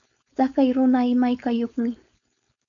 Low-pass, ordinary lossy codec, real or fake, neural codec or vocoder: 7.2 kHz; MP3, 96 kbps; fake; codec, 16 kHz, 4.8 kbps, FACodec